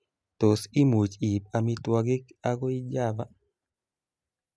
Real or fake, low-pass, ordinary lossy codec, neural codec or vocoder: real; none; none; none